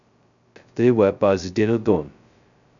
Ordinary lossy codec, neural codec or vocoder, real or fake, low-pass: none; codec, 16 kHz, 0.2 kbps, FocalCodec; fake; 7.2 kHz